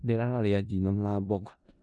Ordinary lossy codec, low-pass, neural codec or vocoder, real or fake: none; 10.8 kHz; codec, 16 kHz in and 24 kHz out, 0.4 kbps, LongCat-Audio-Codec, four codebook decoder; fake